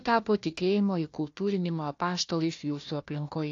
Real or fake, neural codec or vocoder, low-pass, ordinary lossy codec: fake; codec, 16 kHz, 1 kbps, FunCodec, trained on Chinese and English, 50 frames a second; 7.2 kHz; AAC, 48 kbps